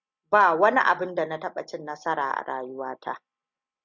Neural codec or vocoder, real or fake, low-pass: none; real; 7.2 kHz